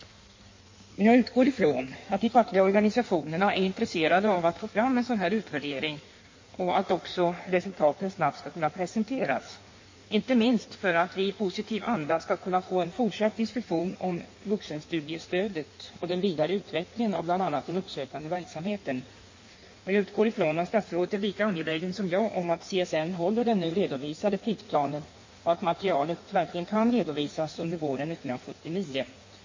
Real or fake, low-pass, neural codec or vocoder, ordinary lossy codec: fake; 7.2 kHz; codec, 16 kHz in and 24 kHz out, 1.1 kbps, FireRedTTS-2 codec; MP3, 32 kbps